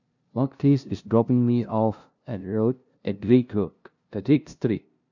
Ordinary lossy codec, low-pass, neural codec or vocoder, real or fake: none; 7.2 kHz; codec, 16 kHz, 0.5 kbps, FunCodec, trained on LibriTTS, 25 frames a second; fake